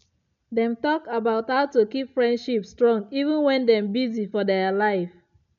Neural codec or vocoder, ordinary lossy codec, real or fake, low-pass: none; none; real; 7.2 kHz